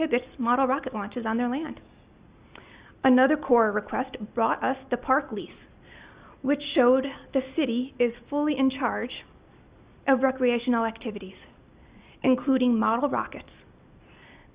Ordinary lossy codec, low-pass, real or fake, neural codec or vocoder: Opus, 64 kbps; 3.6 kHz; real; none